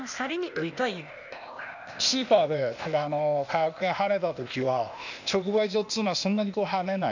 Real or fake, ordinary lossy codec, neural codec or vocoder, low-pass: fake; none; codec, 16 kHz, 0.8 kbps, ZipCodec; 7.2 kHz